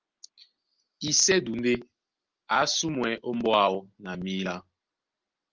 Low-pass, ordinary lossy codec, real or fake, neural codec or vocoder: 7.2 kHz; Opus, 24 kbps; real; none